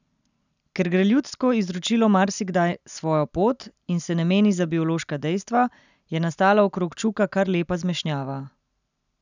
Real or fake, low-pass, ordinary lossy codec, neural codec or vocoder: real; 7.2 kHz; none; none